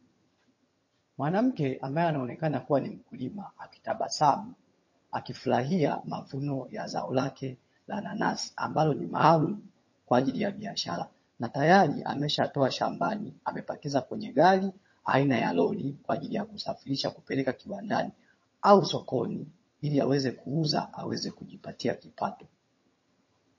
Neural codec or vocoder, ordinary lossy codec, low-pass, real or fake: vocoder, 22.05 kHz, 80 mel bands, HiFi-GAN; MP3, 32 kbps; 7.2 kHz; fake